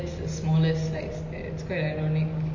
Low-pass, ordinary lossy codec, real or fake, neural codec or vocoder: 7.2 kHz; MP3, 32 kbps; real; none